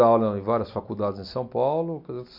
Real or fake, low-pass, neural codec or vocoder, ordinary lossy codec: real; 5.4 kHz; none; MP3, 48 kbps